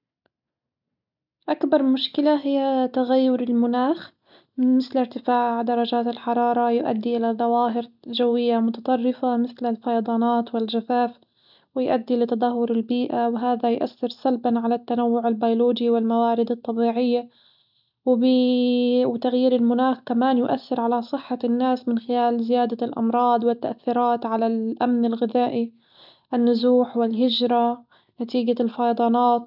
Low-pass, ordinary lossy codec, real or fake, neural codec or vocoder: 5.4 kHz; none; real; none